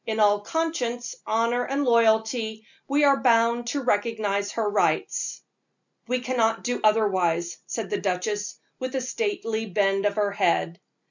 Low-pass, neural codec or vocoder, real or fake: 7.2 kHz; none; real